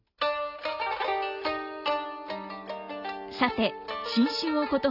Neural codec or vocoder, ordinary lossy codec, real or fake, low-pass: none; none; real; 5.4 kHz